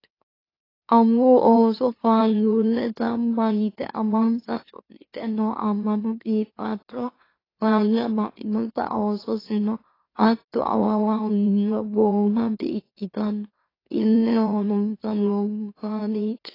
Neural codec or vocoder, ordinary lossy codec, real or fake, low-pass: autoencoder, 44.1 kHz, a latent of 192 numbers a frame, MeloTTS; AAC, 24 kbps; fake; 5.4 kHz